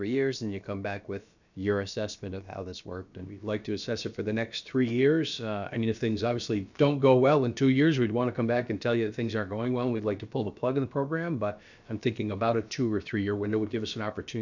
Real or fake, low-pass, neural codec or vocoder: fake; 7.2 kHz; codec, 16 kHz, about 1 kbps, DyCAST, with the encoder's durations